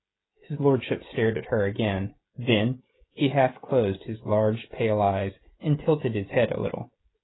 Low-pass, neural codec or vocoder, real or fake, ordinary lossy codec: 7.2 kHz; codec, 16 kHz, 16 kbps, FreqCodec, smaller model; fake; AAC, 16 kbps